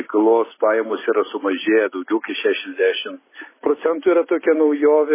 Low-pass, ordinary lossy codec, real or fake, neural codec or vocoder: 3.6 kHz; MP3, 16 kbps; real; none